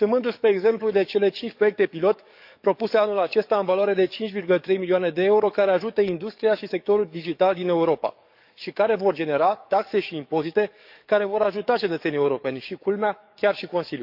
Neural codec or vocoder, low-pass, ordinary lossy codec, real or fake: codec, 44.1 kHz, 7.8 kbps, DAC; 5.4 kHz; none; fake